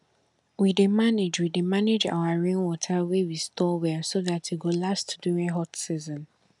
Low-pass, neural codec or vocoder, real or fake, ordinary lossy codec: 10.8 kHz; none; real; none